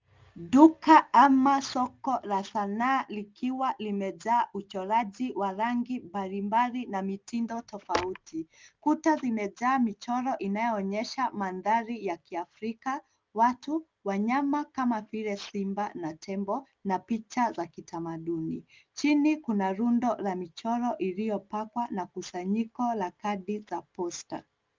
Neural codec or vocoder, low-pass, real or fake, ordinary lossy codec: none; 7.2 kHz; real; Opus, 24 kbps